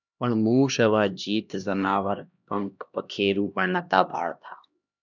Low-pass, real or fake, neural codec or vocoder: 7.2 kHz; fake; codec, 16 kHz, 1 kbps, X-Codec, HuBERT features, trained on LibriSpeech